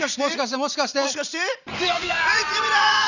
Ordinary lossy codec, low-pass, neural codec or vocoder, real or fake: none; 7.2 kHz; vocoder, 44.1 kHz, 80 mel bands, Vocos; fake